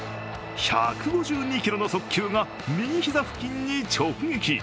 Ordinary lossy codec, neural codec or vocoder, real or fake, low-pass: none; none; real; none